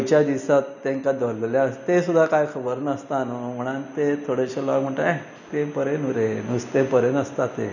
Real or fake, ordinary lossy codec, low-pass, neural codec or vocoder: real; none; 7.2 kHz; none